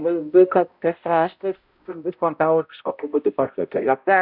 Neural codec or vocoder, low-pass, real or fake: codec, 16 kHz, 0.5 kbps, X-Codec, HuBERT features, trained on balanced general audio; 5.4 kHz; fake